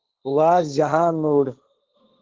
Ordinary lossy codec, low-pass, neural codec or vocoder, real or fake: Opus, 16 kbps; 7.2 kHz; codec, 16 kHz, 1.1 kbps, Voila-Tokenizer; fake